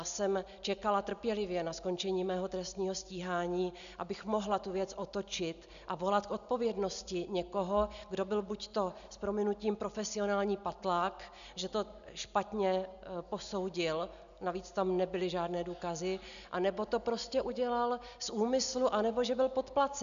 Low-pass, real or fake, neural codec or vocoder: 7.2 kHz; real; none